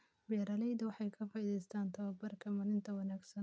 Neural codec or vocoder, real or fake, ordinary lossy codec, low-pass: none; real; none; none